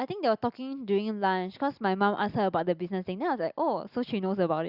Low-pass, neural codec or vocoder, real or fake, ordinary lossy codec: 5.4 kHz; none; real; Opus, 64 kbps